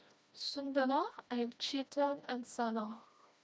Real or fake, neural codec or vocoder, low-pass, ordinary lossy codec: fake; codec, 16 kHz, 1 kbps, FreqCodec, smaller model; none; none